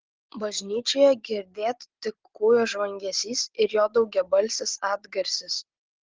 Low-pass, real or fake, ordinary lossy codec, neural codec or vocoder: 7.2 kHz; real; Opus, 16 kbps; none